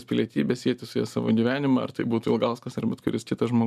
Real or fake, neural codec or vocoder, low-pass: real; none; 14.4 kHz